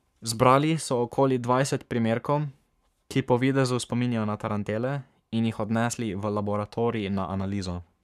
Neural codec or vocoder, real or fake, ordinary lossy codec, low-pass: codec, 44.1 kHz, 7.8 kbps, Pupu-Codec; fake; none; 14.4 kHz